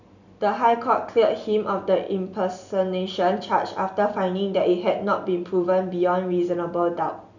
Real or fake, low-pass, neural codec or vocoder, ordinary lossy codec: real; 7.2 kHz; none; none